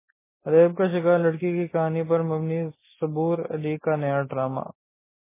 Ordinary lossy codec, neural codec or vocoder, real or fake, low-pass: MP3, 16 kbps; none; real; 3.6 kHz